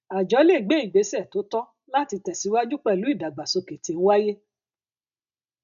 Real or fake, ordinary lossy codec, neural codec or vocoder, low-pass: real; none; none; 7.2 kHz